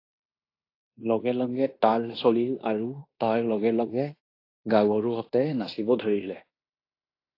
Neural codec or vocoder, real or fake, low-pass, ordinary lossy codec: codec, 16 kHz in and 24 kHz out, 0.9 kbps, LongCat-Audio-Codec, fine tuned four codebook decoder; fake; 5.4 kHz; AAC, 32 kbps